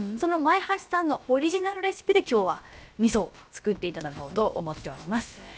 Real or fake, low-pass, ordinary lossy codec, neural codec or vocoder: fake; none; none; codec, 16 kHz, about 1 kbps, DyCAST, with the encoder's durations